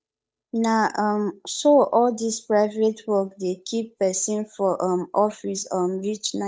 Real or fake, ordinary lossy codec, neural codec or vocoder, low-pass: fake; none; codec, 16 kHz, 8 kbps, FunCodec, trained on Chinese and English, 25 frames a second; none